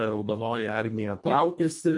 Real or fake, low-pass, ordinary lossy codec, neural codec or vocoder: fake; 10.8 kHz; MP3, 64 kbps; codec, 24 kHz, 1.5 kbps, HILCodec